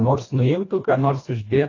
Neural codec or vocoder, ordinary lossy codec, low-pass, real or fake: codec, 24 kHz, 1.5 kbps, HILCodec; AAC, 32 kbps; 7.2 kHz; fake